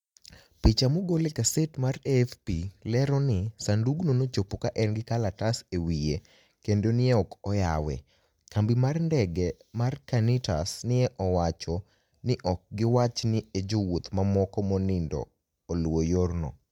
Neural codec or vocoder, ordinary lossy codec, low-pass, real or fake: none; MP3, 96 kbps; 19.8 kHz; real